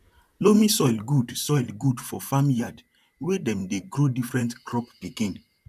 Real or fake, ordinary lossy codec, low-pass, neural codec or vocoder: fake; none; 14.4 kHz; vocoder, 44.1 kHz, 128 mel bands, Pupu-Vocoder